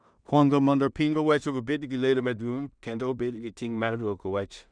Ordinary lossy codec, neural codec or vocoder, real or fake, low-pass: none; codec, 16 kHz in and 24 kHz out, 0.4 kbps, LongCat-Audio-Codec, two codebook decoder; fake; 9.9 kHz